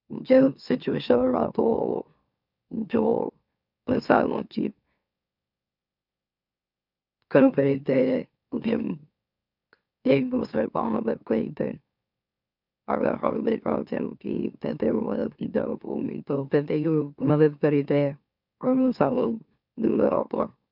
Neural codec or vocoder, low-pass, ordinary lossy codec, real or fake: autoencoder, 44.1 kHz, a latent of 192 numbers a frame, MeloTTS; 5.4 kHz; none; fake